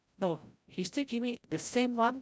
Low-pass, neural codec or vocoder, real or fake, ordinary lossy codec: none; codec, 16 kHz, 0.5 kbps, FreqCodec, larger model; fake; none